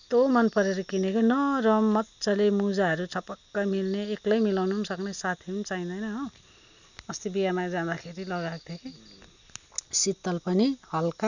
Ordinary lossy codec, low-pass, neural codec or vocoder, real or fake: none; 7.2 kHz; none; real